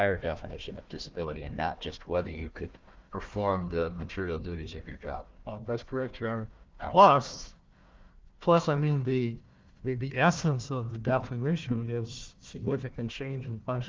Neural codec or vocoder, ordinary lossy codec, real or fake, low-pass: codec, 16 kHz, 1 kbps, FunCodec, trained on Chinese and English, 50 frames a second; Opus, 32 kbps; fake; 7.2 kHz